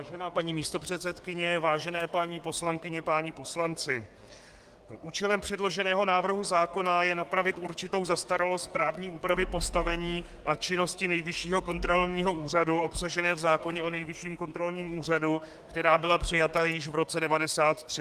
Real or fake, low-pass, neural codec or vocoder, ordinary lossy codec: fake; 14.4 kHz; codec, 32 kHz, 1.9 kbps, SNAC; Opus, 32 kbps